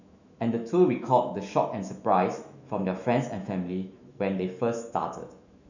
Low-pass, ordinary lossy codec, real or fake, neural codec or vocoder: 7.2 kHz; none; fake; autoencoder, 48 kHz, 128 numbers a frame, DAC-VAE, trained on Japanese speech